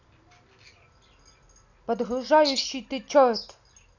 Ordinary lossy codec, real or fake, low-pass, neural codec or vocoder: none; real; 7.2 kHz; none